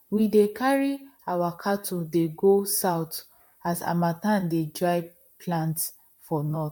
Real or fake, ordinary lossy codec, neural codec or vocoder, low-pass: fake; MP3, 96 kbps; vocoder, 44.1 kHz, 128 mel bands, Pupu-Vocoder; 19.8 kHz